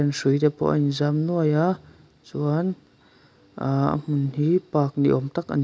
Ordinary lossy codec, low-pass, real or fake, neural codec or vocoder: none; none; real; none